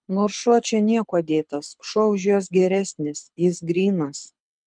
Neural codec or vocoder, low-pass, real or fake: codec, 24 kHz, 6 kbps, HILCodec; 9.9 kHz; fake